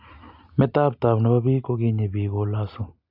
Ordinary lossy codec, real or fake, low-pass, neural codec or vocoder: AAC, 48 kbps; real; 5.4 kHz; none